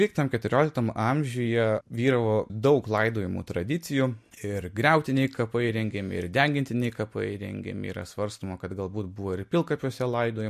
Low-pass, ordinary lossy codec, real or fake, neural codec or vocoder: 14.4 kHz; MP3, 64 kbps; real; none